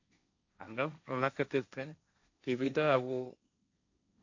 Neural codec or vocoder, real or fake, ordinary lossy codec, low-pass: codec, 16 kHz, 1.1 kbps, Voila-Tokenizer; fake; AAC, 48 kbps; 7.2 kHz